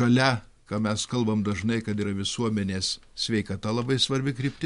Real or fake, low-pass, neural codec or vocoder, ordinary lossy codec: real; 10.8 kHz; none; MP3, 64 kbps